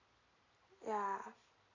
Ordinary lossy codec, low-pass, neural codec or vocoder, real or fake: AAC, 48 kbps; 7.2 kHz; none; real